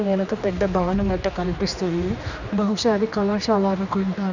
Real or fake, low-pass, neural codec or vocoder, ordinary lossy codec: fake; 7.2 kHz; codec, 16 kHz, 2 kbps, X-Codec, HuBERT features, trained on general audio; none